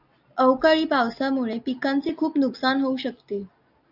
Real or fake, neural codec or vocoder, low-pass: real; none; 5.4 kHz